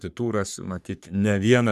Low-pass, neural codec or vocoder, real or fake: 14.4 kHz; codec, 44.1 kHz, 3.4 kbps, Pupu-Codec; fake